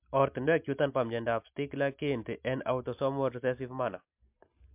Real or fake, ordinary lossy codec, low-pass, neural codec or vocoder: real; MP3, 32 kbps; 3.6 kHz; none